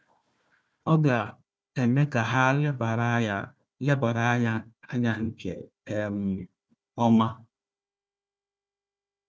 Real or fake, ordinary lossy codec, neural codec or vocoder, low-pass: fake; none; codec, 16 kHz, 1 kbps, FunCodec, trained on Chinese and English, 50 frames a second; none